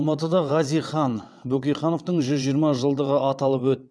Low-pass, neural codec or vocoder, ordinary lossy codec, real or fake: none; vocoder, 22.05 kHz, 80 mel bands, WaveNeXt; none; fake